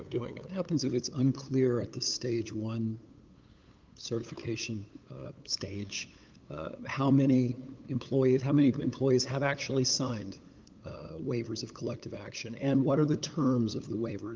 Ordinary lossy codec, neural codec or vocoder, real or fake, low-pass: Opus, 24 kbps; codec, 16 kHz, 8 kbps, FunCodec, trained on LibriTTS, 25 frames a second; fake; 7.2 kHz